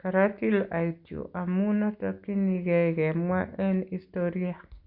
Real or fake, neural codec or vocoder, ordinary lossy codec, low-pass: real; none; Opus, 64 kbps; 5.4 kHz